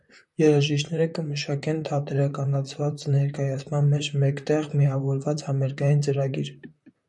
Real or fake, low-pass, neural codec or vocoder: fake; 10.8 kHz; vocoder, 44.1 kHz, 128 mel bands, Pupu-Vocoder